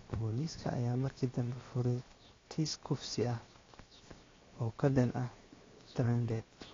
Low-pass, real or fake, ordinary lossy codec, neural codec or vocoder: 7.2 kHz; fake; AAC, 32 kbps; codec, 16 kHz, 0.7 kbps, FocalCodec